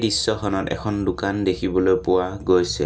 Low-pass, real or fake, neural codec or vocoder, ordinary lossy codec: none; real; none; none